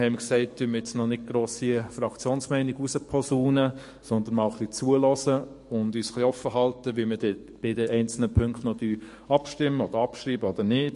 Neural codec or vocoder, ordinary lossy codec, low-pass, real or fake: codec, 44.1 kHz, 7.8 kbps, DAC; MP3, 48 kbps; 14.4 kHz; fake